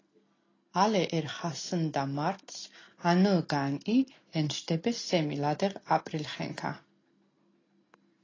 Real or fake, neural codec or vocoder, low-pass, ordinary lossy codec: real; none; 7.2 kHz; AAC, 32 kbps